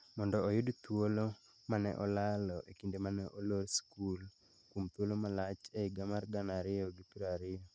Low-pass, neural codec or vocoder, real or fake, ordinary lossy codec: none; none; real; none